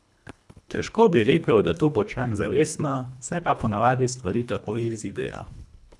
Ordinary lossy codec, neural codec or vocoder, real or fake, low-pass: none; codec, 24 kHz, 1.5 kbps, HILCodec; fake; none